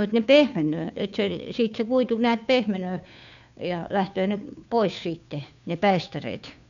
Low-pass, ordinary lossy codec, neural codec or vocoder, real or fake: 7.2 kHz; none; codec, 16 kHz, 2 kbps, FunCodec, trained on Chinese and English, 25 frames a second; fake